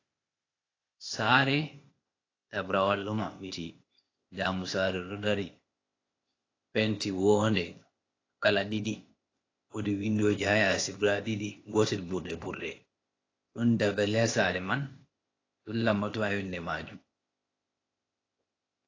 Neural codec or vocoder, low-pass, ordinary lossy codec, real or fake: codec, 16 kHz, 0.8 kbps, ZipCodec; 7.2 kHz; AAC, 32 kbps; fake